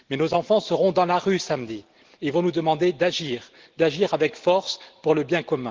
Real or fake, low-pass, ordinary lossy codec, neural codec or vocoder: real; 7.2 kHz; Opus, 16 kbps; none